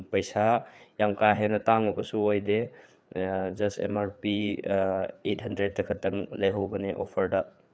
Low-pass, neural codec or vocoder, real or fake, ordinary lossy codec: none; codec, 16 kHz, 4 kbps, FreqCodec, larger model; fake; none